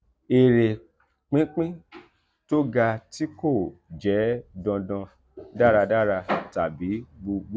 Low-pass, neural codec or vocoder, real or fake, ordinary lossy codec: none; none; real; none